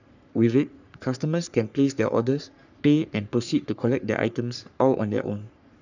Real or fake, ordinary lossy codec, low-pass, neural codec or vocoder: fake; none; 7.2 kHz; codec, 44.1 kHz, 3.4 kbps, Pupu-Codec